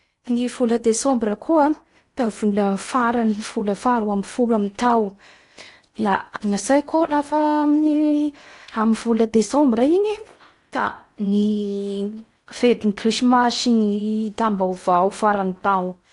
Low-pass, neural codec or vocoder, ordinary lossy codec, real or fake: 10.8 kHz; codec, 16 kHz in and 24 kHz out, 0.8 kbps, FocalCodec, streaming, 65536 codes; AAC, 48 kbps; fake